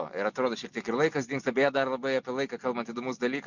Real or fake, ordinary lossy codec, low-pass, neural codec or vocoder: real; MP3, 64 kbps; 7.2 kHz; none